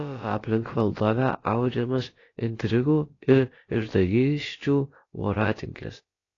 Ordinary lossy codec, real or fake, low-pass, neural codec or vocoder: AAC, 32 kbps; fake; 7.2 kHz; codec, 16 kHz, about 1 kbps, DyCAST, with the encoder's durations